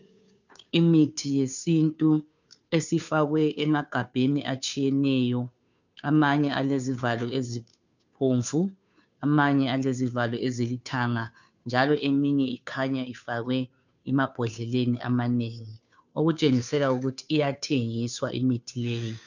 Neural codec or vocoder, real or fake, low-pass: codec, 16 kHz, 2 kbps, FunCodec, trained on Chinese and English, 25 frames a second; fake; 7.2 kHz